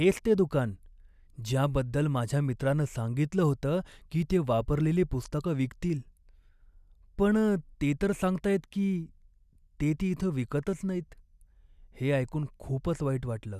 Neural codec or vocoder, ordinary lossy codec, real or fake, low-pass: none; none; real; 14.4 kHz